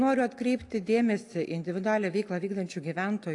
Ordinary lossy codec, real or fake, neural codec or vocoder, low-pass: AAC, 48 kbps; real; none; 10.8 kHz